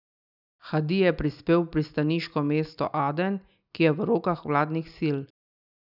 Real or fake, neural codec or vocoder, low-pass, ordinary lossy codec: real; none; 5.4 kHz; none